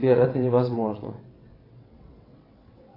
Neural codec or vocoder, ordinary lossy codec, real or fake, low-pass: vocoder, 22.05 kHz, 80 mel bands, WaveNeXt; AAC, 24 kbps; fake; 5.4 kHz